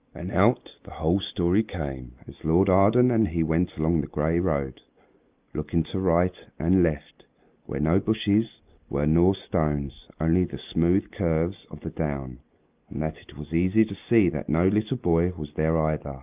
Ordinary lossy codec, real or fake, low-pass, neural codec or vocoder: Opus, 24 kbps; real; 3.6 kHz; none